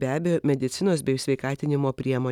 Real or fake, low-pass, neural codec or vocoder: real; 19.8 kHz; none